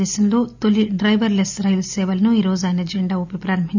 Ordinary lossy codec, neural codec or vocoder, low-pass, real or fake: none; none; 7.2 kHz; real